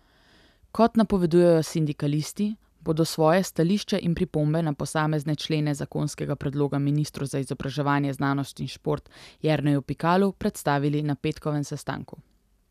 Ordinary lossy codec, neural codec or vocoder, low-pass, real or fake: none; none; 14.4 kHz; real